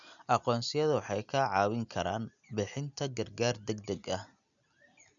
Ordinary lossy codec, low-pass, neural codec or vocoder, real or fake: none; 7.2 kHz; none; real